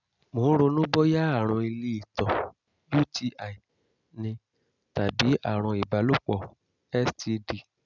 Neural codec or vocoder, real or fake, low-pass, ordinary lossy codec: none; real; 7.2 kHz; none